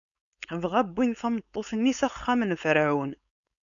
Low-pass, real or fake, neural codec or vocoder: 7.2 kHz; fake; codec, 16 kHz, 4.8 kbps, FACodec